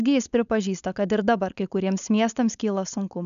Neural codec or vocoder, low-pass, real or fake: codec, 16 kHz, 4.8 kbps, FACodec; 7.2 kHz; fake